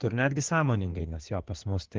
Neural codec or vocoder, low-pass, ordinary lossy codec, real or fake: codec, 16 kHz in and 24 kHz out, 2.2 kbps, FireRedTTS-2 codec; 7.2 kHz; Opus, 16 kbps; fake